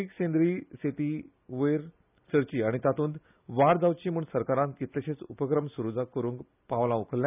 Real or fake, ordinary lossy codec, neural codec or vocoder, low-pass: real; none; none; 3.6 kHz